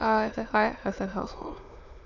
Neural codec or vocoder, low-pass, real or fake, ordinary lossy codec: autoencoder, 22.05 kHz, a latent of 192 numbers a frame, VITS, trained on many speakers; 7.2 kHz; fake; none